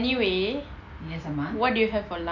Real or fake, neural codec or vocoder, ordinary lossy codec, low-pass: real; none; none; 7.2 kHz